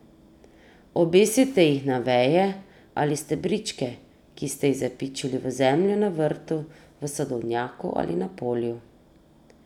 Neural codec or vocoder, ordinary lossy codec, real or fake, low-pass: none; none; real; 19.8 kHz